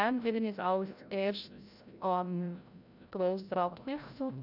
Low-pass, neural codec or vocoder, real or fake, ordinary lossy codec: 5.4 kHz; codec, 16 kHz, 0.5 kbps, FreqCodec, larger model; fake; none